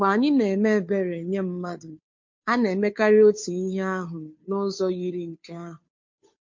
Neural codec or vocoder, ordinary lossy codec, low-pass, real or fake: codec, 16 kHz, 2 kbps, FunCodec, trained on Chinese and English, 25 frames a second; MP3, 48 kbps; 7.2 kHz; fake